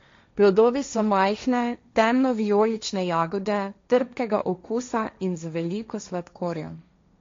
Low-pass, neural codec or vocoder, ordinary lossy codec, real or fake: 7.2 kHz; codec, 16 kHz, 1.1 kbps, Voila-Tokenizer; MP3, 48 kbps; fake